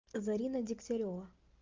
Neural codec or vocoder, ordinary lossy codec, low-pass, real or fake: none; Opus, 24 kbps; 7.2 kHz; real